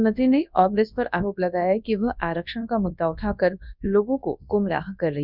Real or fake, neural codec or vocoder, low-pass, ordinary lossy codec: fake; codec, 24 kHz, 0.9 kbps, WavTokenizer, large speech release; 5.4 kHz; none